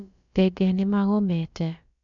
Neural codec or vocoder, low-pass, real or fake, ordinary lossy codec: codec, 16 kHz, about 1 kbps, DyCAST, with the encoder's durations; 7.2 kHz; fake; none